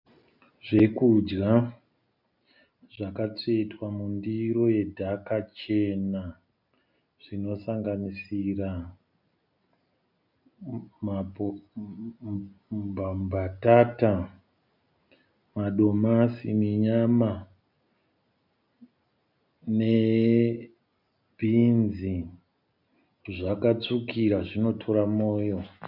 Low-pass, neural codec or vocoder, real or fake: 5.4 kHz; none; real